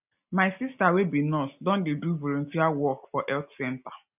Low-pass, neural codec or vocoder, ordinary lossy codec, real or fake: 3.6 kHz; none; none; real